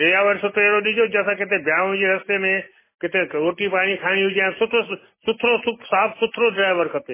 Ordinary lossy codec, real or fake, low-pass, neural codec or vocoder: MP3, 16 kbps; real; 3.6 kHz; none